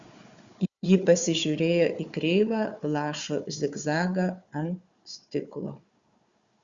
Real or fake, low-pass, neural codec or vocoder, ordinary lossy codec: fake; 7.2 kHz; codec, 16 kHz, 4 kbps, FunCodec, trained on Chinese and English, 50 frames a second; Opus, 64 kbps